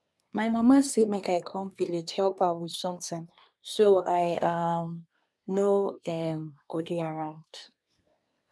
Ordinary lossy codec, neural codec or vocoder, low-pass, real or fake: none; codec, 24 kHz, 1 kbps, SNAC; none; fake